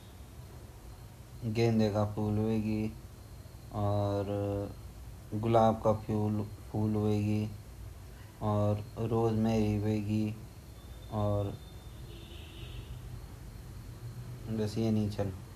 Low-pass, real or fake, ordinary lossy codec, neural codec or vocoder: 14.4 kHz; real; none; none